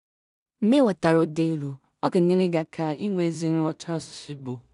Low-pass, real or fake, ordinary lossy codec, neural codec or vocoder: 10.8 kHz; fake; none; codec, 16 kHz in and 24 kHz out, 0.4 kbps, LongCat-Audio-Codec, two codebook decoder